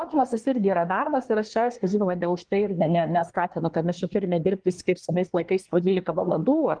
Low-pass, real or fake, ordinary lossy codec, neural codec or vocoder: 9.9 kHz; fake; Opus, 24 kbps; codec, 24 kHz, 1 kbps, SNAC